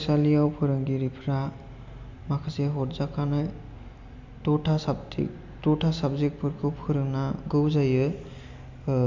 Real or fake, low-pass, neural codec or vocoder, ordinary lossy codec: real; 7.2 kHz; none; MP3, 64 kbps